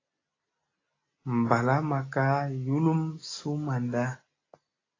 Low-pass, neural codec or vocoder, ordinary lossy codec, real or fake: 7.2 kHz; none; AAC, 32 kbps; real